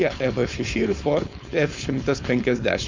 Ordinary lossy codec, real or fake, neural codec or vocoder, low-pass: MP3, 64 kbps; fake; codec, 16 kHz, 4.8 kbps, FACodec; 7.2 kHz